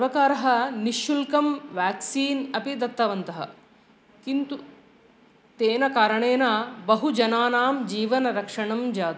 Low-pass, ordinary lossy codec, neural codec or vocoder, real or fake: none; none; none; real